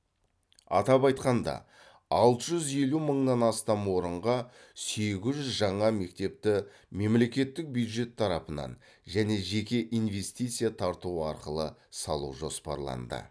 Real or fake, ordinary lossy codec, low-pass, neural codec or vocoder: real; none; none; none